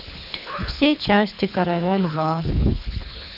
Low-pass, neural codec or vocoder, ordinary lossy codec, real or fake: 5.4 kHz; codec, 24 kHz, 3 kbps, HILCodec; none; fake